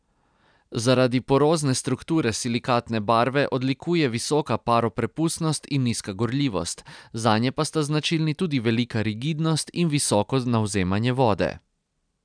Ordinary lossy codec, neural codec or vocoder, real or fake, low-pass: none; none; real; 9.9 kHz